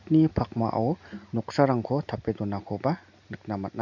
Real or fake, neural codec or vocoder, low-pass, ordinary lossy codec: real; none; 7.2 kHz; none